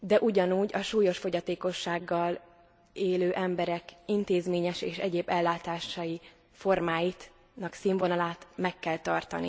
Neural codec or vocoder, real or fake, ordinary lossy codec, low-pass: none; real; none; none